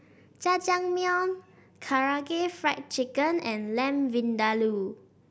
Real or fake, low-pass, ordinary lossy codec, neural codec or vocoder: real; none; none; none